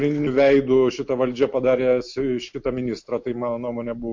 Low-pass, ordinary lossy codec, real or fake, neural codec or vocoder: 7.2 kHz; MP3, 48 kbps; real; none